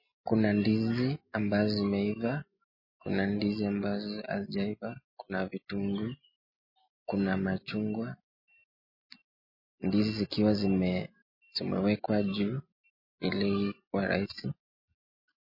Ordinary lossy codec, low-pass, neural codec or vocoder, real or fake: MP3, 24 kbps; 5.4 kHz; none; real